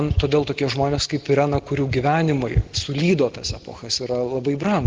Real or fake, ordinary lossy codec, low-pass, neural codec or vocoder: real; Opus, 16 kbps; 7.2 kHz; none